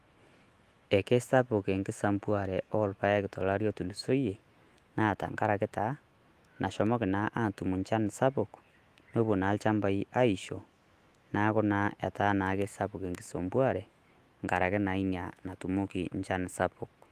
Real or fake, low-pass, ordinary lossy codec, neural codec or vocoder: fake; 14.4 kHz; Opus, 24 kbps; autoencoder, 48 kHz, 128 numbers a frame, DAC-VAE, trained on Japanese speech